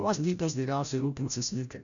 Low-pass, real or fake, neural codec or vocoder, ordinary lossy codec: 7.2 kHz; fake; codec, 16 kHz, 0.5 kbps, FreqCodec, larger model; MP3, 96 kbps